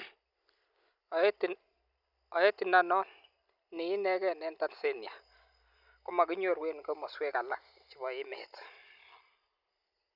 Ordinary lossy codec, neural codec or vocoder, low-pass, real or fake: none; none; 5.4 kHz; real